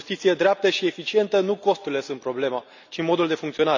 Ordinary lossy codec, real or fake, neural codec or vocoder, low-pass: none; real; none; 7.2 kHz